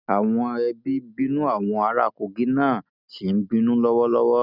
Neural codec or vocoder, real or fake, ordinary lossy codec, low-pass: none; real; none; 5.4 kHz